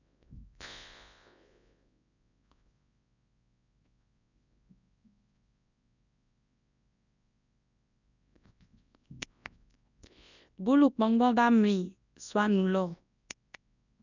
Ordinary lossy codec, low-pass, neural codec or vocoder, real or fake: none; 7.2 kHz; codec, 24 kHz, 0.9 kbps, WavTokenizer, large speech release; fake